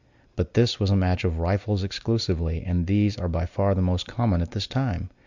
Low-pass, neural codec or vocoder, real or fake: 7.2 kHz; none; real